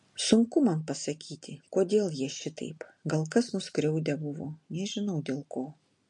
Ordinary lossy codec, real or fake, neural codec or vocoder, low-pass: MP3, 48 kbps; real; none; 10.8 kHz